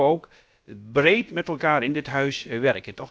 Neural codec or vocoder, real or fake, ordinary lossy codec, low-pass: codec, 16 kHz, about 1 kbps, DyCAST, with the encoder's durations; fake; none; none